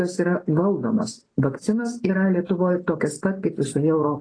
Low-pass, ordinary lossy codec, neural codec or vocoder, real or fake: 9.9 kHz; AAC, 32 kbps; none; real